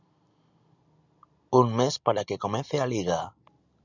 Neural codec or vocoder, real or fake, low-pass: none; real; 7.2 kHz